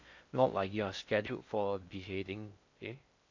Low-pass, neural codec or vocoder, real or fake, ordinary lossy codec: 7.2 kHz; codec, 16 kHz in and 24 kHz out, 0.6 kbps, FocalCodec, streaming, 4096 codes; fake; MP3, 48 kbps